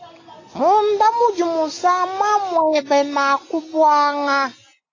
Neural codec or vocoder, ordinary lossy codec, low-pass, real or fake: none; AAC, 32 kbps; 7.2 kHz; real